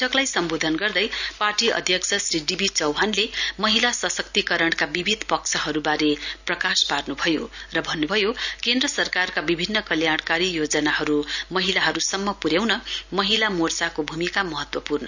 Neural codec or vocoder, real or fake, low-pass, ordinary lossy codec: none; real; 7.2 kHz; none